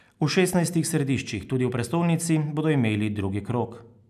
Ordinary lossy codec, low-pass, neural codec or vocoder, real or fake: none; 14.4 kHz; none; real